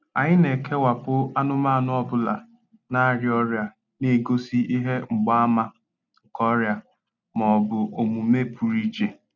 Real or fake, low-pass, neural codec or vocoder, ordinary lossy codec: real; 7.2 kHz; none; none